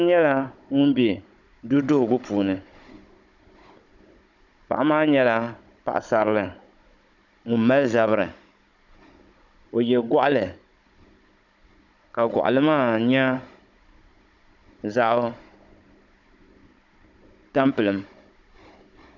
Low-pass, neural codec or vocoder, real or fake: 7.2 kHz; codec, 16 kHz, 16 kbps, FunCodec, trained on Chinese and English, 50 frames a second; fake